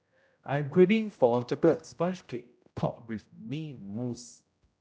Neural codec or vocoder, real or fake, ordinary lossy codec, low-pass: codec, 16 kHz, 0.5 kbps, X-Codec, HuBERT features, trained on general audio; fake; none; none